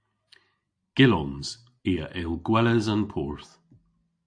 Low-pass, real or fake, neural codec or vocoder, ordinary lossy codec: 9.9 kHz; real; none; AAC, 64 kbps